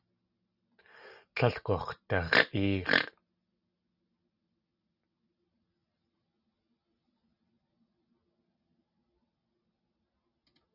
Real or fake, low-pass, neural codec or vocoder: real; 5.4 kHz; none